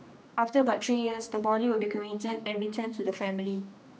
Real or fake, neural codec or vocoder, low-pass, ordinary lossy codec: fake; codec, 16 kHz, 2 kbps, X-Codec, HuBERT features, trained on general audio; none; none